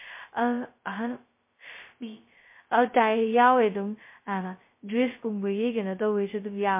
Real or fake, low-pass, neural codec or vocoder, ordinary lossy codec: fake; 3.6 kHz; codec, 16 kHz, 0.2 kbps, FocalCodec; MP3, 24 kbps